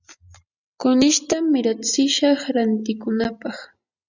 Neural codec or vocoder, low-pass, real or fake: none; 7.2 kHz; real